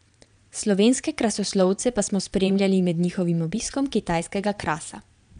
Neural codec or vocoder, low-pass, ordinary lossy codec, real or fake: vocoder, 22.05 kHz, 80 mel bands, Vocos; 9.9 kHz; none; fake